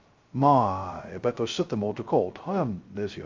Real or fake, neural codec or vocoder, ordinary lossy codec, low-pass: fake; codec, 16 kHz, 0.2 kbps, FocalCodec; Opus, 32 kbps; 7.2 kHz